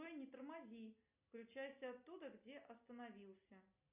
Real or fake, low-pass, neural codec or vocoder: real; 3.6 kHz; none